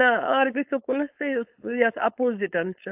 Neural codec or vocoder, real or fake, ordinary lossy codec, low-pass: codec, 16 kHz, 4.8 kbps, FACodec; fake; AAC, 32 kbps; 3.6 kHz